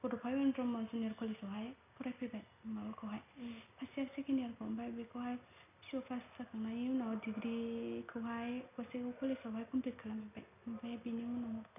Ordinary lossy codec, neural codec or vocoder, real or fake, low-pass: none; none; real; 3.6 kHz